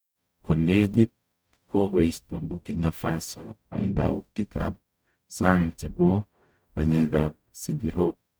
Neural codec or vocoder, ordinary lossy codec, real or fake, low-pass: codec, 44.1 kHz, 0.9 kbps, DAC; none; fake; none